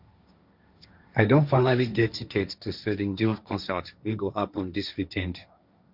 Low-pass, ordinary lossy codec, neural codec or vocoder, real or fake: 5.4 kHz; Opus, 64 kbps; codec, 16 kHz, 1.1 kbps, Voila-Tokenizer; fake